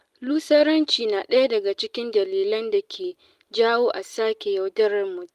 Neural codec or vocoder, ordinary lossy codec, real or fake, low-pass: none; Opus, 32 kbps; real; 14.4 kHz